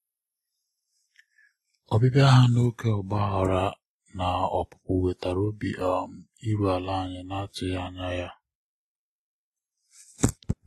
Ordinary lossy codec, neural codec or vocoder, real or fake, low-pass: AAC, 32 kbps; none; real; 19.8 kHz